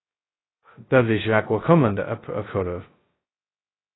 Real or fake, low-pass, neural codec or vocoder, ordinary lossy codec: fake; 7.2 kHz; codec, 16 kHz, 0.2 kbps, FocalCodec; AAC, 16 kbps